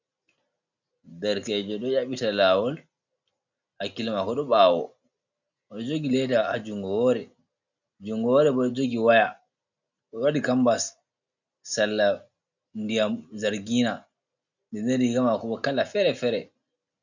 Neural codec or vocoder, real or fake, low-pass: none; real; 7.2 kHz